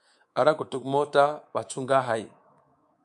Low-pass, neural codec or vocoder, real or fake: 10.8 kHz; codec, 24 kHz, 3.1 kbps, DualCodec; fake